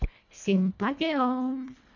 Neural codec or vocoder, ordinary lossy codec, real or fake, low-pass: codec, 24 kHz, 1.5 kbps, HILCodec; none; fake; 7.2 kHz